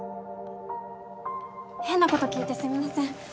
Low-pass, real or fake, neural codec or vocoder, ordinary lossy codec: none; real; none; none